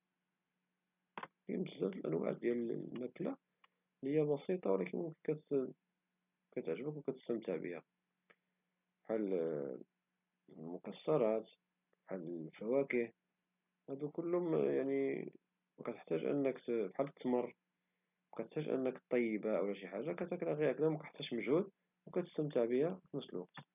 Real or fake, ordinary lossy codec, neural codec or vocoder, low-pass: real; none; none; 3.6 kHz